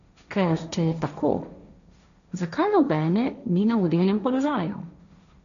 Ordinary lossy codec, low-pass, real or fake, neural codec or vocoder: none; 7.2 kHz; fake; codec, 16 kHz, 1.1 kbps, Voila-Tokenizer